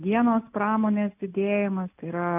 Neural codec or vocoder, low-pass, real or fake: none; 3.6 kHz; real